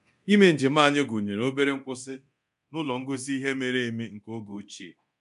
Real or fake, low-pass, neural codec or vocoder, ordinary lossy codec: fake; 10.8 kHz; codec, 24 kHz, 0.9 kbps, DualCodec; MP3, 96 kbps